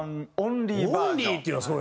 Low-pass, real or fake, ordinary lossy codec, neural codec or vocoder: none; real; none; none